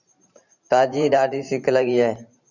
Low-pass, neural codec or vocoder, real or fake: 7.2 kHz; vocoder, 44.1 kHz, 128 mel bands every 512 samples, BigVGAN v2; fake